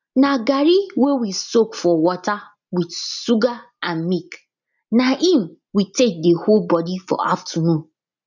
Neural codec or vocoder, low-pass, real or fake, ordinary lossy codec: none; 7.2 kHz; real; none